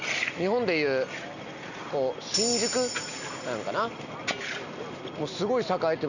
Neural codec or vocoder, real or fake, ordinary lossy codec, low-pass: none; real; none; 7.2 kHz